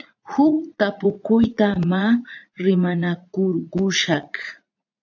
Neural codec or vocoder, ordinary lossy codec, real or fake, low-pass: vocoder, 44.1 kHz, 80 mel bands, Vocos; AAC, 48 kbps; fake; 7.2 kHz